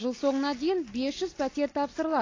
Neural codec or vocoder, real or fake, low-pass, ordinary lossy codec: none; real; 7.2 kHz; AAC, 32 kbps